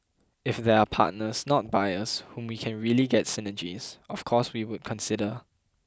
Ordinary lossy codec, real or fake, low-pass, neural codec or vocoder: none; real; none; none